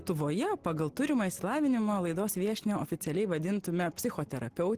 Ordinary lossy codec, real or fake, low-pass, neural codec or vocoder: Opus, 16 kbps; real; 14.4 kHz; none